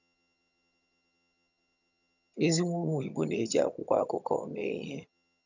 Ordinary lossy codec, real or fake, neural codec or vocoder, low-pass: none; fake; vocoder, 22.05 kHz, 80 mel bands, HiFi-GAN; 7.2 kHz